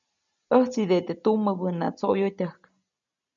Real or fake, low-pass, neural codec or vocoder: real; 7.2 kHz; none